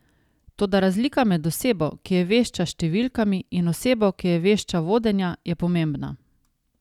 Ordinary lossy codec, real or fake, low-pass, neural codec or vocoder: none; real; 19.8 kHz; none